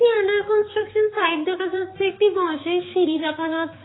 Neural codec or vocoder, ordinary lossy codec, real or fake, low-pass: codec, 16 kHz, 4 kbps, X-Codec, HuBERT features, trained on balanced general audio; AAC, 16 kbps; fake; 7.2 kHz